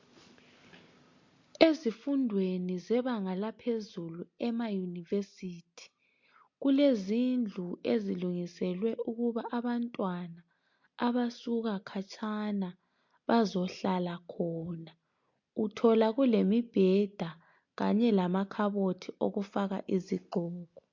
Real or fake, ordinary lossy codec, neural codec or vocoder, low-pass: real; MP3, 48 kbps; none; 7.2 kHz